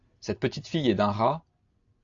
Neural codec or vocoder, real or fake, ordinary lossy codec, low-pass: none; real; AAC, 48 kbps; 7.2 kHz